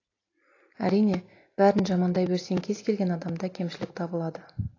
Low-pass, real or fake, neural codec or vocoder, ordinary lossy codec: 7.2 kHz; real; none; AAC, 32 kbps